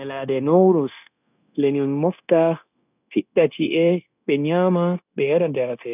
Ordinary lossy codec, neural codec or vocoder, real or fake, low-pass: none; codec, 16 kHz, 0.9 kbps, LongCat-Audio-Codec; fake; 3.6 kHz